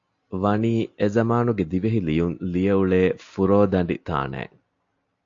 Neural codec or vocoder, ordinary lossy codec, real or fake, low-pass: none; AAC, 48 kbps; real; 7.2 kHz